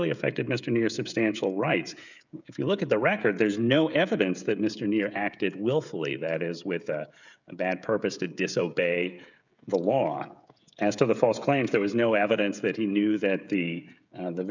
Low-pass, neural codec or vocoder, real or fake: 7.2 kHz; codec, 16 kHz, 16 kbps, FreqCodec, smaller model; fake